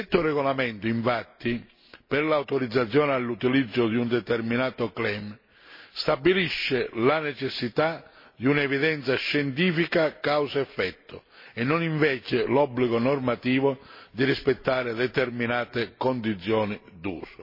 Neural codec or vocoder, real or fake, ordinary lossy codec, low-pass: none; real; MP3, 24 kbps; 5.4 kHz